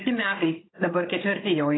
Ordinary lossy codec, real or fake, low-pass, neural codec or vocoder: AAC, 16 kbps; fake; 7.2 kHz; codec, 16 kHz, 16 kbps, FunCodec, trained on Chinese and English, 50 frames a second